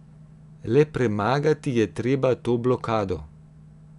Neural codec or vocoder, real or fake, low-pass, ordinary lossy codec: none; real; 10.8 kHz; none